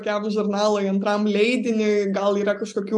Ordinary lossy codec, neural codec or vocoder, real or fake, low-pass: MP3, 96 kbps; none; real; 10.8 kHz